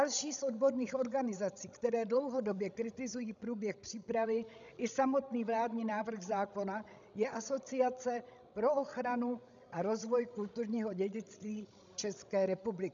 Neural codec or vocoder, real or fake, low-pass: codec, 16 kHz, 16 kbps, FreqCodec, larger model; fake; 7.2 kHz